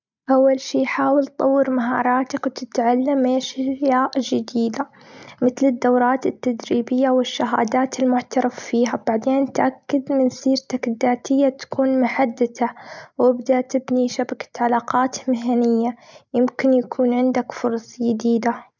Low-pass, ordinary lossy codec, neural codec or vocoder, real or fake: 7.2 kHz; none; none; real